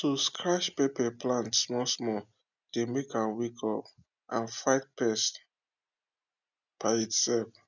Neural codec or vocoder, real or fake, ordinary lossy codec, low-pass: none; real; none; 7.2 kHz